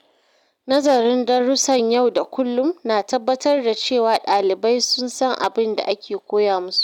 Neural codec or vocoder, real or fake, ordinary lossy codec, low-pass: none; real; none; 19.8 kHz